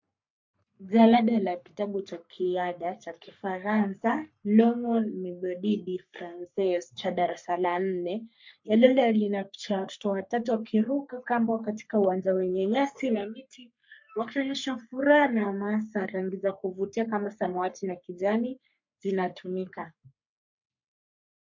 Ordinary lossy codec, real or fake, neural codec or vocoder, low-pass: MP3, 48 kbps; fake; codec, 44.1 kHz, 3.4 kbps, Pupu-Codec; 7.2 kHz